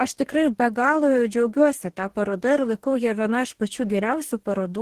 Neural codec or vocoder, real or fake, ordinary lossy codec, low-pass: codec, 44.1 kHz, 2.6 kbps, DAC; fake; Opus, 16 kbps; 14.4 kHz